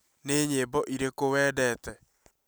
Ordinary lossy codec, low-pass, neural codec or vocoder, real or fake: none; none; none; real